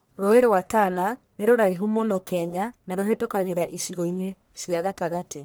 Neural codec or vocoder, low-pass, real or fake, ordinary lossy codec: codec, 44.1 kHz, 1.7 kbps, Pupu-Codec; none; fake; none